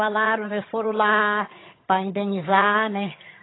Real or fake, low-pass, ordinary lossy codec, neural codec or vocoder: fake; 7.2 kHz; AAC, 16 kbps; vocoder, 22.05 kHz, 80 mel bands, HiFi-GAN